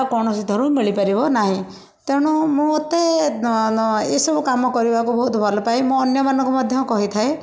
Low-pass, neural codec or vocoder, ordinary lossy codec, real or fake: none; none; none; real